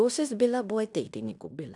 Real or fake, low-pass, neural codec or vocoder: fake; 10.8 kHz; codec, 16 kHz in and 24 kHz out, 0.9 kbps, LongCat-Audio-Codec, fine tuned four codebook decoder